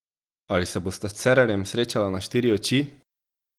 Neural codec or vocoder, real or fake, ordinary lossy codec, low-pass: none; real; Opus, 24 kbps; 19.8 kHz